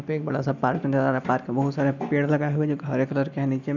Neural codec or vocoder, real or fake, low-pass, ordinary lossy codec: none; real; 7.2 kHz; none